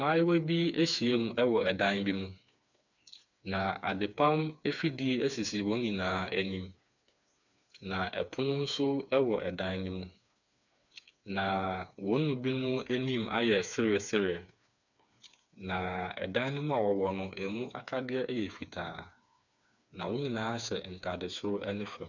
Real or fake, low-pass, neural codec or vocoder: fake; 7.2 kHz; codec, 16 kHz, 4 kbps, FreqCodec, smaller model